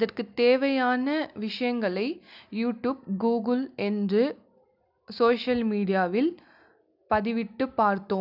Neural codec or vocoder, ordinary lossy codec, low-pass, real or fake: none; none; 5.4 kHz; real